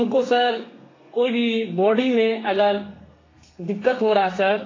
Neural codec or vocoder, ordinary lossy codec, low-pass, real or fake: codec, 32 kHz, 1.9 kbps, SNAC; AAC, 32 kbps; 7.2 kHz; fake